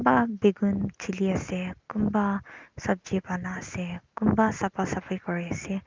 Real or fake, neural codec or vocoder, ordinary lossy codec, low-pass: real; none; Opus, 32 kbps; 7.2 kHz